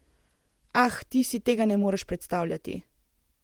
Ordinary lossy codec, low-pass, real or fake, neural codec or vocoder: Opus, 24 kbps; 19.8 kHz; fake; vocoder, 48 kHz, 128 mel bands, Vocos